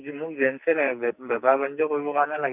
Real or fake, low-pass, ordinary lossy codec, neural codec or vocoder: fake; 3.6 kHz; Opus, 64 kbps; codec, 44.1 kHz, 2.6 kbps, SNAC